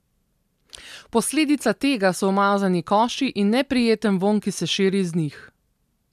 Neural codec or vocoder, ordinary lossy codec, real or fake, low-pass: none; MP3, 96 kbps; real; 14.4 kHz